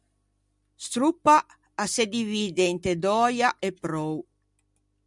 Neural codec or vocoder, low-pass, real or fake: none; 10.8 kHz; real